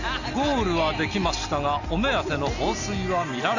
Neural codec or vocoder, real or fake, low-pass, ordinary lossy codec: none; real; 7.2 kHz; none